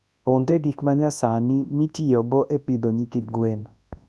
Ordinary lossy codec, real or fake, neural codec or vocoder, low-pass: none; fake; codec, 24 kHz, 0.9 kbps, WavTokenizer, large speech release; none